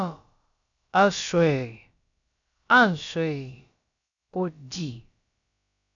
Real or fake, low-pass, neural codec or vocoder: fake; 7.2 kHz; codec, 16 kHz, about 1 kbps, DyCAST, with the encoder's durations